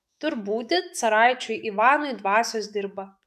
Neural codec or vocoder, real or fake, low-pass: autoencoder, 48 kHz, 128 numbers a frame, DAC-VAE, trained on Japanese speech; fake; 14.4 kHz